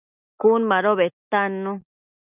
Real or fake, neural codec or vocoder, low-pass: real; none; 3.6 kHz